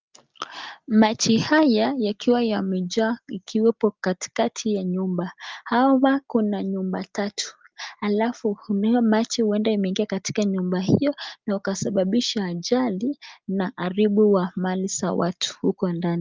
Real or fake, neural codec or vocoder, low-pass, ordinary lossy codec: real; none; 7.2 kHz; Opus, 24 kbps